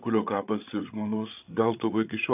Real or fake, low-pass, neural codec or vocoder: fake; 3.6 kHz; codec, 16 kHz, 8 kbps, FreqCodec, larger model